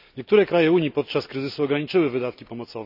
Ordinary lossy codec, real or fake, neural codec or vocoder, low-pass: none; real; none; 5.4 kHz